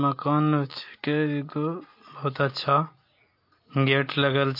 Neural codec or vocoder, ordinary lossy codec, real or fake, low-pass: none; MP3, 32 kbps; real; 5.4 kHz